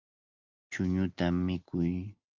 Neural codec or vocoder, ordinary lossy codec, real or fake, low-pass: none; Opus, 24 kbps; real; 7.2 kHz